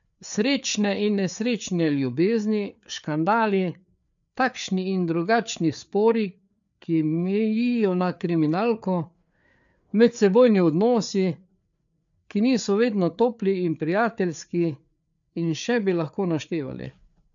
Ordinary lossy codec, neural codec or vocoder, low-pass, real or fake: none; codec, 16 kHz, 4 kbps, FreqCodec, larger model; 7.2 kHz; fake